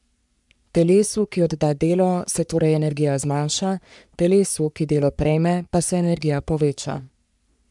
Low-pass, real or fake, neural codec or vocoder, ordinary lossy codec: 10.8 kHz; fake; codec, 44.1 kHz, 3.4 kbps, Pupu-Codec; MP3, 96 kbps